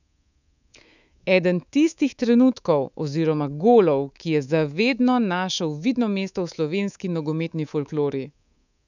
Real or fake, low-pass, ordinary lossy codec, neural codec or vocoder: fake; 7.2 kHz; none; codec, 24 kHz, 3.1 kbps, DualCodec